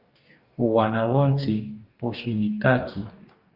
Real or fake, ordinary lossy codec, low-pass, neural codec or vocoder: fake; Opus, 32 kbps; 5.4 kHz; codec, 44.1 kHz, 2.6 kbps, DAC